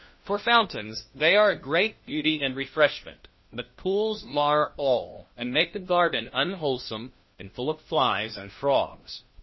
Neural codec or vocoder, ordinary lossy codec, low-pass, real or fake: codec, 16 kHz, 1 kbps, FunCodec, trained on LibriTTS, 50 frames a second; MP3, 24 kbps; 7.2 kHz; fake